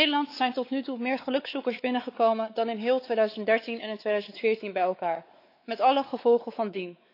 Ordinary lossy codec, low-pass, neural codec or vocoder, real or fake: AAC, 32 kbps; 5.4 kHz; codec, 16 kHz, 4 kbps, X-Codec, WavLM features, trained on Multilingual LibriSpeech; fake